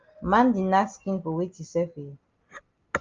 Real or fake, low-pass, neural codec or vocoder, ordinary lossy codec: real; 7.2 kHz; none; Opus, 32 kbps